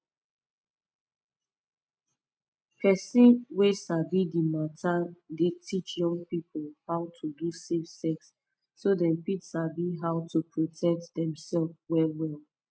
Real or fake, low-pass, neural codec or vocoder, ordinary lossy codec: real; none; none; none